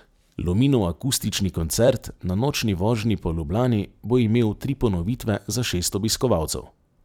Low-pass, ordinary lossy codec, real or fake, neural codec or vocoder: 19.8 kHz; none; fake; vocoder, 44.1 kHz, 128 mel bands every 256 samples, BigVGAN v2